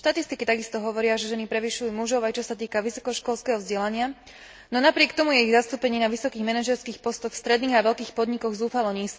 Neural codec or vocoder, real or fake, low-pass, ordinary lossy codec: none; real; none; none